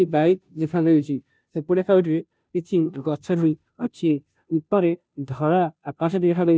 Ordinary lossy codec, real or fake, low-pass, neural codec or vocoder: none; fake; none; codec, 16 kHz, 0.5 kbps, FunCodec, trained on Chinese and English, 25 frames a second